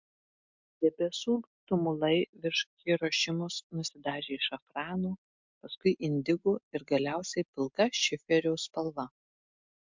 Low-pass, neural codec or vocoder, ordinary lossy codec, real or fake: 7.2 kHz; none; MP3, 64 kbps; real